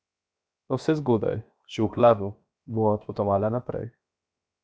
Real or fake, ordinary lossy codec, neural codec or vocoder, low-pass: fake; none; codec, 16 kHz, 0.7 kbps, FocalCodec; none